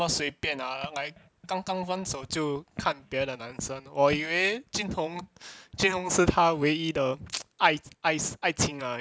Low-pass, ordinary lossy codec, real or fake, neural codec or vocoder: none; none; real; none